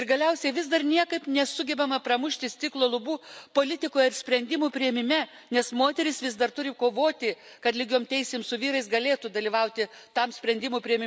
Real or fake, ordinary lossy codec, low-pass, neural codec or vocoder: real; none; none; none